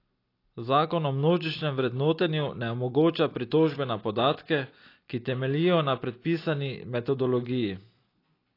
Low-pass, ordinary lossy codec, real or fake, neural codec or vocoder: 5.4 kHz; AAC, 32 kbps; real; none